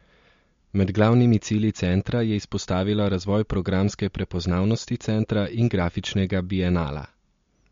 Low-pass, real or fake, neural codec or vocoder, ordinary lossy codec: 7.2 kHz; real; none; MP3, 48 kbps